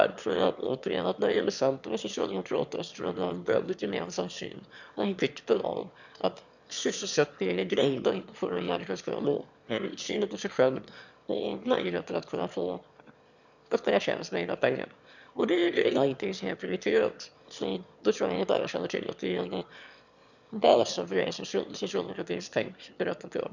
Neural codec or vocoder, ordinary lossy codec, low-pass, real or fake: autoencoder, 22.05 kHz, a latent of 192 numbers a frame, VITS, trained on one speaker; none; 7.2 kHz; fake